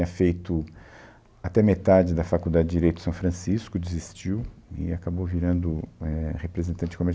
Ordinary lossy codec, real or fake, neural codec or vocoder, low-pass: none; real; none; none